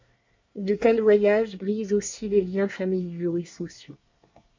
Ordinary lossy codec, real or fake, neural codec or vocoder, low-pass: MP3, 48 kbps; fake; codec, 24 kHz, 1 kbps, SNAC; 7.2 kHz